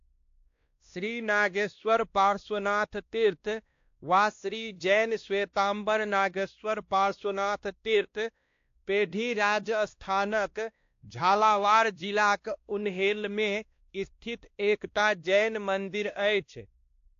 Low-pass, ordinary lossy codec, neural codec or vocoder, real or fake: 7.2 kHz; AAC, 48 kbps; codec, 16 kHz, 1 kbps, X-Codec, WavLM features, trained on Multilingual LibriSpeech; fake